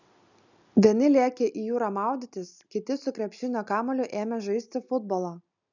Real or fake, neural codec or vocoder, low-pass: real; none; 7.2 kHz